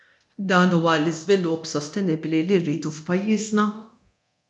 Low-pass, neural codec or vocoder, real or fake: 10.8 kHz; codec, 24 kHz, 0.9 kbps, DualCodec; fake